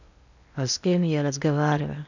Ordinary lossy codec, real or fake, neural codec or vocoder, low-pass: none; fake; codec, 16 kHz in and 24 kHz out, 0.8 kbps, FocalCodec, streaming, 65536 codes; 7.2 kHz